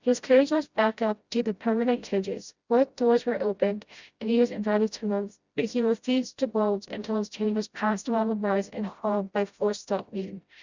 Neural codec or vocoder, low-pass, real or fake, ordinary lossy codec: codec, 16 kHz, 0.5 kbps, FreqCodec, smaller model; 7.2 kHz; fake; Opus, 64 kbps